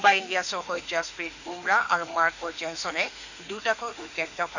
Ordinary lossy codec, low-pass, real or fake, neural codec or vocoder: none; 7.2 kHz; fake; autoencoder, 48 kHz, 32 numbers a frame, DAC-VAE, trained on Japanese speech